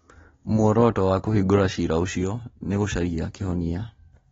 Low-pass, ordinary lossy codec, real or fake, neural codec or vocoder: 7.2 kHz; AAC, 24 kbps; real; none